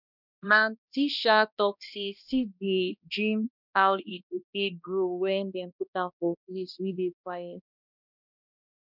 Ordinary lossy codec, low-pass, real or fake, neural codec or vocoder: none; 5.4 kHz; fake; codec, 16 kHz, 1 kbps, X-Codec, HuBERT features, trained on balanced general audio